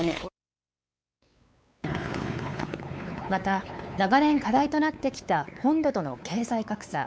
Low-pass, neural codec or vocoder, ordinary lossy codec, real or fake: none; codec, 16 kHz, 4 kbps, X-Codec, WavLM features, trained on Multilingual LibriSpeech; none; fake